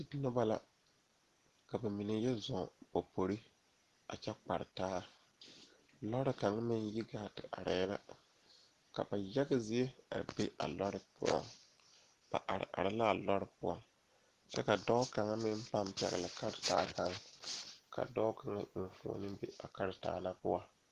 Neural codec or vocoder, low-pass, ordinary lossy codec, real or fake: none; 14.4 kHz; Opus, 16 kbps; real